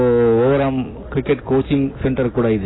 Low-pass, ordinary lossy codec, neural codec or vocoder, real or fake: 7.2 kHz; AAC, 16 kbps; none; real